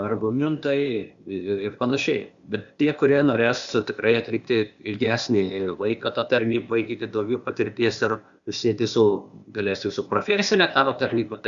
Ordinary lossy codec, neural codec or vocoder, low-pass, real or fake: Opus, 64 kbps; codec, 16 kHz, 0.8 kbps, ZipCodec; 7.2 kHz; fake